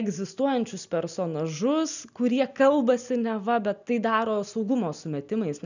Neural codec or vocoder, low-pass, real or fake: none; 7.2 kHz; real